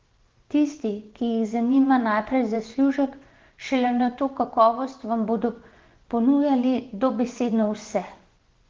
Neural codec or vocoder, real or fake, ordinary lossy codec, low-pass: vocoder, 24 kHz, 100 mel bands, Vocos; fake; Opus, 16 kbps; 7.2 kHz